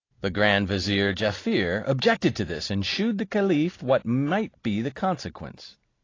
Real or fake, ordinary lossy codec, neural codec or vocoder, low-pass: real; AAC, 32 kbps; none; 7.2 kHz